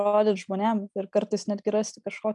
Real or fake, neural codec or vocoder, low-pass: real; none; 10.8 kHz